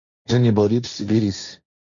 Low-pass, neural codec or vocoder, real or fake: 7.2 kHz; codec, 16 kHz, 1.1 kbps, Voila-Tokenizer; fake